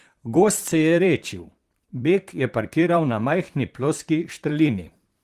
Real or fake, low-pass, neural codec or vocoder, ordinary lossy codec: fake; 14.4 kHz; vocoder, 44.1 kHz, 128 mel bands, Pupu-Vocoder; Opus, 24 kbps